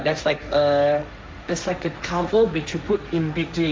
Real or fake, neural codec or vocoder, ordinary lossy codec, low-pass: fake; codec, 16 kHz, 1.1 kbps, Voila-Tokenizer; none; none